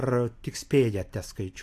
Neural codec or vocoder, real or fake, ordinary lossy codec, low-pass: none; real; AAC, 64 kbps; 14.4 kHz